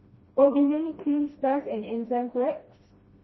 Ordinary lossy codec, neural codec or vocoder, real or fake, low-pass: MP3, 24 kbps; codec, 16 kHz, 1 kbps, FreqCodec, smaller model; fake; 7.2 kHz